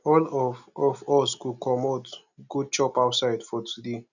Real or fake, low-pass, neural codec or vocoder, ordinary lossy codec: real; 7.2 kHz; none; none